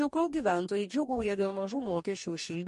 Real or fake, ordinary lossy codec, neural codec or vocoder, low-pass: fake; MP3, 48 kbps; codec, 44.1 kHz, 2.6 kbps, DAC; 14.4 kHz